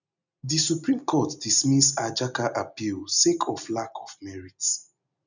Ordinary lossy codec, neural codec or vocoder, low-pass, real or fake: none; none; 7.2 kHz; real